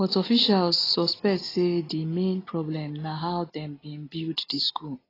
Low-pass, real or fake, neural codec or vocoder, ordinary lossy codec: 5.4 kHz; real; none; AAC, 24 kbps